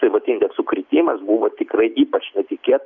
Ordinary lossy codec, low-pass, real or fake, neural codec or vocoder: MP3, 64 kbps; 7.2 kHz; real; none